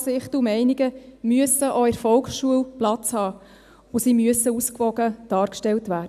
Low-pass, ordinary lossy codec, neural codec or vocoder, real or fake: 14.4 kHz; none; none; real